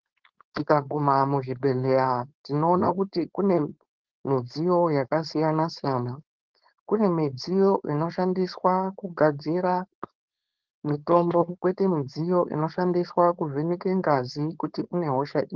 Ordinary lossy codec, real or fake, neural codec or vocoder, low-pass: Opus, 16 kbps; fake; codec, 16 kHz, 4.8 kbps, FACodec; 7.2 kHz